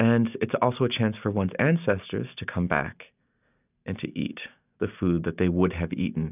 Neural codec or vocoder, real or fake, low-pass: none; real; 3.6 kHz